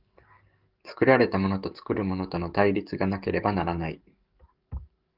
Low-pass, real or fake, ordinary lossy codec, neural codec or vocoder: 5.4 kHz; fake; Opus, 32 kbps; codec, 44.1 kHz, 7.8 kbps, DAC